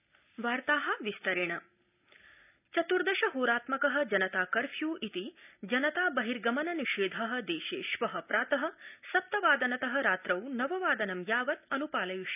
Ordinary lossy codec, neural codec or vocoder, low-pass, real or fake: none; none; 3.6 kHz; real